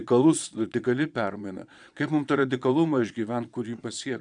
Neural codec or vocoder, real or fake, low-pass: vocoder, 22.05 kHz, 80 mel bands, Vocos; fake; 9.9 kHz